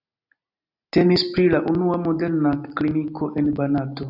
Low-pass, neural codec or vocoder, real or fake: 5.4 kHz; none; real